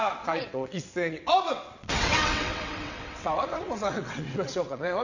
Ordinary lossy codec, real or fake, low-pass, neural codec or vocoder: none; fake; 7.2 kHz; vocoder, 22.05 kHz, 80 mel bands, WaveNeXt